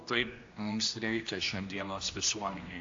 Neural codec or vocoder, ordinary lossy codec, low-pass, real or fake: codec, 16 kHz, 1 kbps, X-Codec, HuBERT features, trained on general audio; AAC, 48 kbps; 7.2 kHz; fake